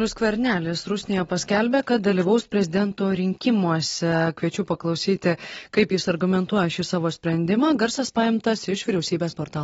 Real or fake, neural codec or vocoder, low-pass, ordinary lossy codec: real; none; 19.8 kHz; AAC, 24 kbps